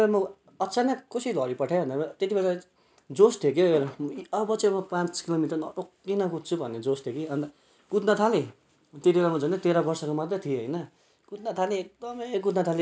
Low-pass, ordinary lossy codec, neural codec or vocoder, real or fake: none; none; none; real